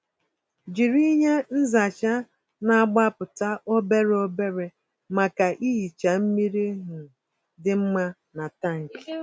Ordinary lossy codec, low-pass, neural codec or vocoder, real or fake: none; none; none; real